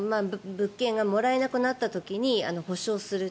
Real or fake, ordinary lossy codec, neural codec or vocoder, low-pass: real; none; none; none